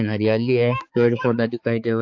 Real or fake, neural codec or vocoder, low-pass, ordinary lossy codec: fake; codec, 16 kHz, 4 kbps, X-Codec, HuBERT features, trained on balanced general audio; 7.2 kHz; AAC, 48 kbps